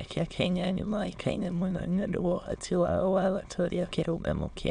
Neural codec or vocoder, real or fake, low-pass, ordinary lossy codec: autoencoder, 22.05 kHz, a latent of 192 numbers a frame, VITS, trained on many speakers; fake; 9.9 kHz; none